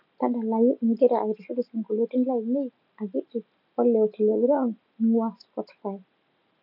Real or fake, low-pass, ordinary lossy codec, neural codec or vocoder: real; 5.4 kHz; none; none